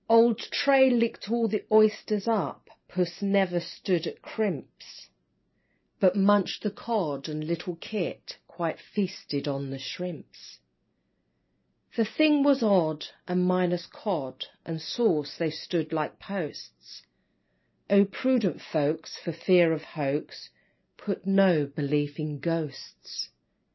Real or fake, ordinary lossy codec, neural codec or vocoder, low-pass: real; MP3, 24 kbps; none; 7.2 kHz